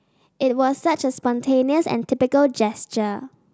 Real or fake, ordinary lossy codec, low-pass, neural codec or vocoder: real; none; none; none